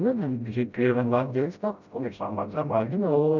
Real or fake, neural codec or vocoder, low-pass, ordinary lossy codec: fake; codec, 16 kHz, 0.5 kbps, FreqCodec, smaller model; 7.2 kHz; none